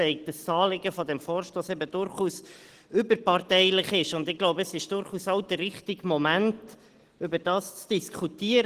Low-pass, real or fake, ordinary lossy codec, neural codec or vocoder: 14.4 kHz; real; Opus, 16 kbps; none